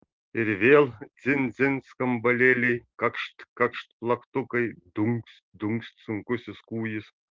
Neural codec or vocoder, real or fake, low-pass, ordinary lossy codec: none; real; 7.2 kHz; Opus, 16 kbps